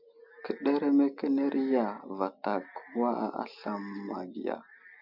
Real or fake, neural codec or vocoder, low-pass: real; none; 5.4 kHz